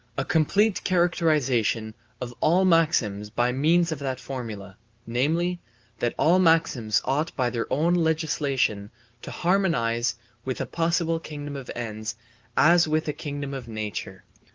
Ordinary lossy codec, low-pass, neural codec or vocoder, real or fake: Opus, 24 kbps; 7.2 kHz; none; real